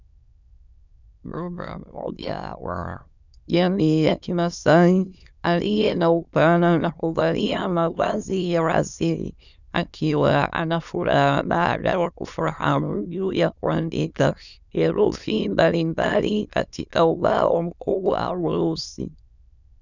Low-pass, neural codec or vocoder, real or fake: 7.2 kHz; autoencoder, 22.05 kHz, a latent of 192 numbers a frame, VITS, trained on many speakers; fake